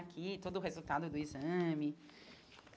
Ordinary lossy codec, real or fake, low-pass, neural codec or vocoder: none; real; none; none